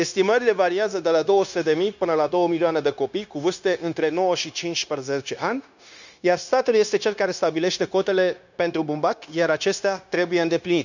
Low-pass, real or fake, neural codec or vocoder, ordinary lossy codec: 7.2 kHz; fake; codec, 16 kHz, 0.9 kbps, LongCat-Audio-Codec; none